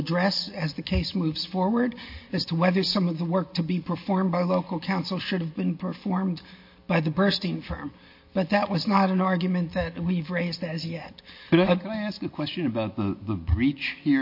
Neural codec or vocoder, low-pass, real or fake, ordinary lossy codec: none; 5.4 kHz; real; MP3, 32 kbps